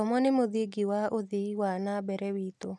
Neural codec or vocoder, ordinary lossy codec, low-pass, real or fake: none; none; none; real